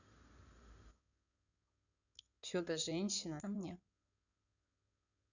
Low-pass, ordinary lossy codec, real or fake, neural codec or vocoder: 7.2 kHz; none; fake; codec, 16 kHz in and 24 kHz out, 2.2 kbps, FireRedTTS-2 codec